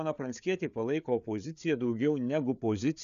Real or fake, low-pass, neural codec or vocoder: fake; 7.2 kHz; codec, 16 kHz, 4 kbps, FreqCodec, larger model